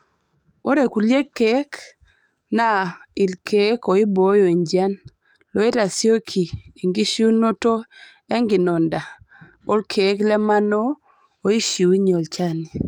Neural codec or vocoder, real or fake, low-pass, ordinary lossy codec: codec, 44.1 kHz, 7.8 kbps, DAC; fake; 19.8 kHz; none